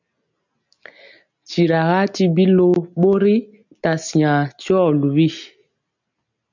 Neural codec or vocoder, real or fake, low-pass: none; real; 7.2 kHz